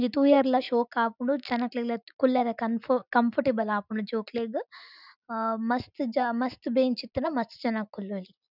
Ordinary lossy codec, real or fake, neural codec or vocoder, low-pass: none; real; none; 5.4 kHz